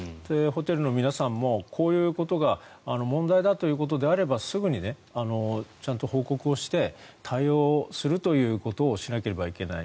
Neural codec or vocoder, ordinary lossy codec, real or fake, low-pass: none; none; real; none